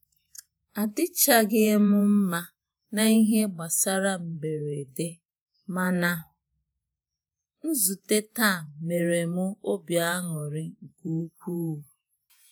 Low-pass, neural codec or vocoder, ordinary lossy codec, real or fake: none; vocoder, 48 kHz, 128 mel bands, Vocos; none; fake